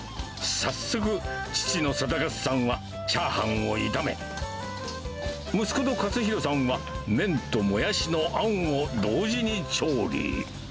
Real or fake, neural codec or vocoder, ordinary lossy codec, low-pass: real; none; none; none